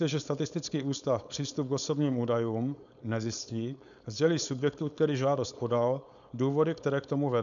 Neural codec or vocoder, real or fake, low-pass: codec, 16 kHz, 4.8 kbps, FACodec; fake; 7.2 kHz